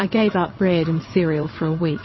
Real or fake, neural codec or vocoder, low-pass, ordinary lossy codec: fake; vocoder, 22.05 kHz, 80 mel bands, WaveNeXt; 7.2 kHz; MP3, 24 kbps